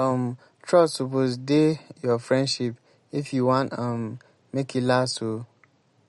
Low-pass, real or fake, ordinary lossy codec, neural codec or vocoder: 14.4 kHz; real; MP3, 48 kbps; none